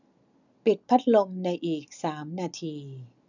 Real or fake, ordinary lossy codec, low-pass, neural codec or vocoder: real; none; 7.2 kHz; none